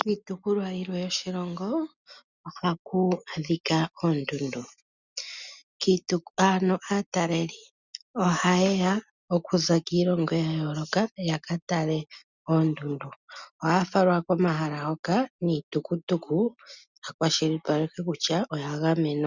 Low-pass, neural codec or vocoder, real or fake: 7.2 kHz; none; real